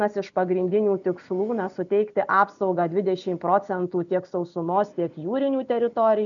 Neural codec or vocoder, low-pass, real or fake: none; 7.2 kHz; real